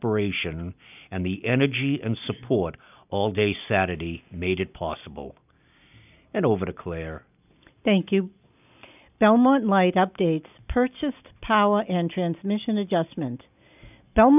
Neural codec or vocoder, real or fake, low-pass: none; real; 3.6 kHz